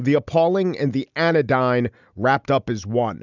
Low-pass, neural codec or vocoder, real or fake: 7.2 kHz; none; real